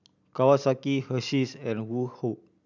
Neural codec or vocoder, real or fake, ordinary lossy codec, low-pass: none; real; none; 7.2 kHz